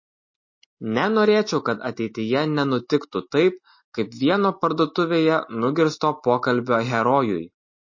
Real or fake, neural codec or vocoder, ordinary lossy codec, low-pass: real; none; MP3, 32 kbps; 7.2 kHz